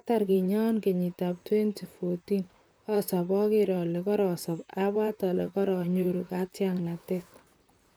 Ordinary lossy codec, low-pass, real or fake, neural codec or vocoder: none; none; fake; vocoder, 44.1 kHz, 128 mel bands, Pupu-Vocoder